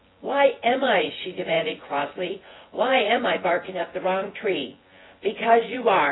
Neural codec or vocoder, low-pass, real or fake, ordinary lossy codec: vocoder, 24 kHz, 100 mel bands, Vocos; 7.2 kHz; fake; AAC, 16 kbps